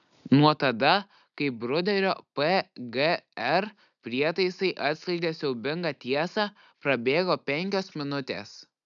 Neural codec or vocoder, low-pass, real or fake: none; 7.2 kHz; real